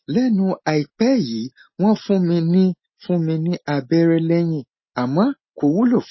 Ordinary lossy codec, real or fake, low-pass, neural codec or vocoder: MP3, 24 kbps; real; 7.2 kHz; none